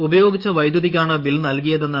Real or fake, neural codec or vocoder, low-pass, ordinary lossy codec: fake; codec, 44.1 kHz, 7.8 kbps, DAC; 5.4 kHz; Opus, 64 kbps